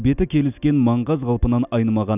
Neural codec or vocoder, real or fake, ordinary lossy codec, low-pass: none; real; none; 3.6 kHz